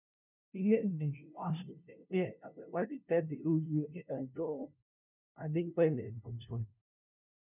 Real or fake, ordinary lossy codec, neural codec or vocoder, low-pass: fake; none; codec, 16 kHz, 0.5 kbps, FunCodec, trained on LibriTTS, 25 frames a second; 3.6 kHz